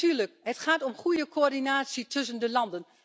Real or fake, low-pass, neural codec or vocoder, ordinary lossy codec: real; none; none; none